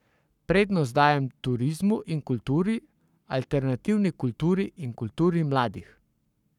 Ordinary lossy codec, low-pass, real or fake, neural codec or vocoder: none; 19.8 kHz; fake; codec, 44.1 kHz, 7.8 kbps, Pupu-Codec